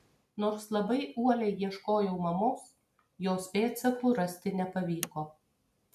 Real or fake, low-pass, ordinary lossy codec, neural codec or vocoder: real; 14.4 kHz; MP3, 96 kbps; none